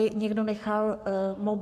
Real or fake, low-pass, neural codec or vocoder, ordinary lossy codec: fake; 14.4 kHz; codec, 44.1 kHz, 7.8 kbps, Pupu-Codec; AAC, 96 kbps